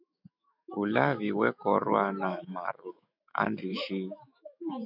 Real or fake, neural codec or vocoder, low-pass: fake; autoencoder, 48 kHz, 128 numbers a frame, DAC-VAE, trained on Japanese speech; 5.4 kHz